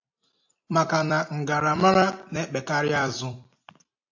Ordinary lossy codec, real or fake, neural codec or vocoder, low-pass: AAC, 48 kbps; fake; vocoder, 44.1 kHz, 128 mel bands every 256 samples, BigVGAN v2; 7.2 kHz